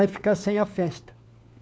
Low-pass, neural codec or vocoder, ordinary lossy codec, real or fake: none; codec, 16 kHz, 4 kbps, FunCodec, trained on LibriTTS, 50 frames a second; none; fake